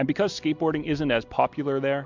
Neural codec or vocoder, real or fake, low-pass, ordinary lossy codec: none; real; 7.2 kHz; MP3, 64 kbps